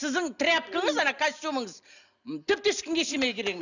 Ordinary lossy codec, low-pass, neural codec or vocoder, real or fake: none; 7.2 kHz; none; real